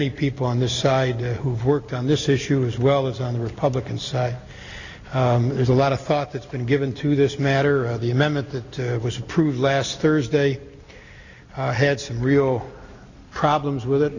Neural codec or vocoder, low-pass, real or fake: none; 7.2 kHz; real